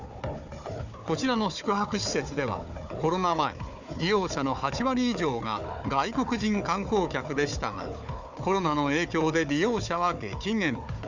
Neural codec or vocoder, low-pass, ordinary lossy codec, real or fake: codec, 16 kHz, 4 kbps, FunCodec, trained on Chinese and English, 50 frames a second; 7.2 kHz; none; fake